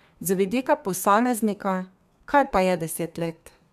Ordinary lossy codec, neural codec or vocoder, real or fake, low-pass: none; codec, 32 kHz, 1.9 kbps, SNAC; fake; 14.4 kHz